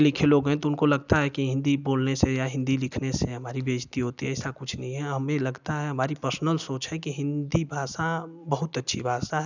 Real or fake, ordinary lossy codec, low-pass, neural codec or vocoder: real; none; 7.2 kHz; none